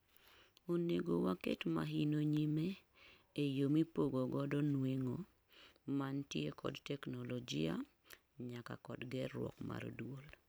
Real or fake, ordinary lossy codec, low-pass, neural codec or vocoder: fake; none; none; vocoder, 44.1 kHz, 128 mel bands every 512 samples, BigVGAN v2